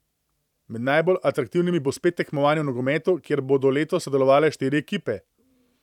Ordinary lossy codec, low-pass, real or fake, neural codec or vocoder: none; 19.8 kHz; fake; vocoder, 44.1 kHz, 128 mel bands every 512 samples, BigVGAN v2